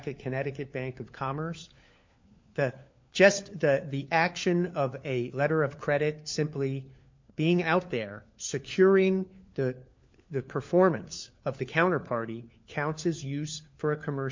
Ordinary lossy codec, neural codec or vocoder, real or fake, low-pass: MP3, 48 kbps; codec, 16 kHz, 4 kbps, FunCodec, trained on LibriTTS, 50 frames a second; fake; 7.2 kHz